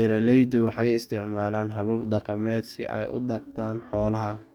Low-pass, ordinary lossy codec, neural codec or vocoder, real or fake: 19.8 kHz; none; codec, 44.1 kHz, 2.6 kbps, DAC; fake